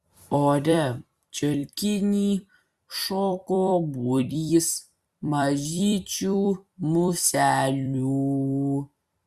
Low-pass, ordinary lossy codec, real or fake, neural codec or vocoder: 14.4 kHz; Opus, 64 kbps; fake; vocoder, 44.1 kHz, 128 mel bands every 256 samples, BigVGAN v2